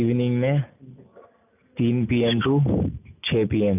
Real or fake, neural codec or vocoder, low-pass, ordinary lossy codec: real; none; 3.6 kHz; none